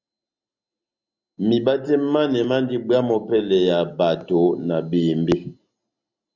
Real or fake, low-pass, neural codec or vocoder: real; 7.2 kHz; none